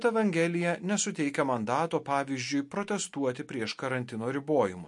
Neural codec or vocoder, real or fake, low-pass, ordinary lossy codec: none; real; 10.8 kHz; MP3, 48 kbps